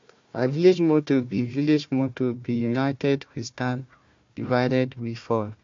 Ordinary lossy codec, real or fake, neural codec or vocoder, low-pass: MP3, 48 kbps; fake; codec, 16 kHz, 1 kbps, FunCodec, trained on Chinese and English, 50 frames a second; 7.2 kHz